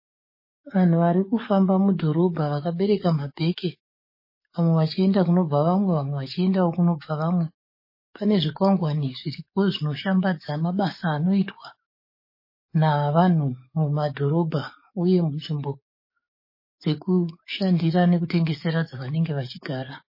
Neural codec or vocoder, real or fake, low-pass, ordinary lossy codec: codec, 44.1 kHz, 7.8 kbps, DAC; fake; 5.4 kHz; MP3, 24 kbps